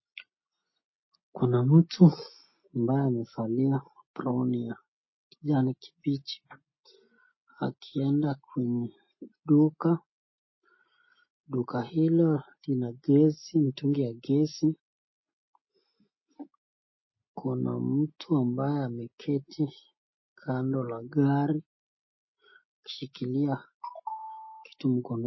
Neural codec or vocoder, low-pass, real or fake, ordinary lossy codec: none; 7.2 kHz; real; MP3, 24 kbps